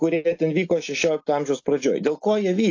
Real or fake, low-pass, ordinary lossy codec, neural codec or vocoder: real; 7.2 kHz; AAC, 48 kbps; none